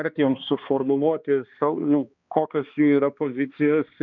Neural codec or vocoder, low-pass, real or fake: codec, 16 kHz, 2 kbps, X-Codec, HuBERT features, trained on balanced general audio; 7.2 kHz; fake